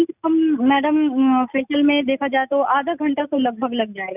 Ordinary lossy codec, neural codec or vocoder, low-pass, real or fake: none; none; 3.6 kHz; real